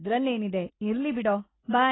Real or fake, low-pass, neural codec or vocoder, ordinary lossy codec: real; 7.2 kHz; none; AAC, 16 kbps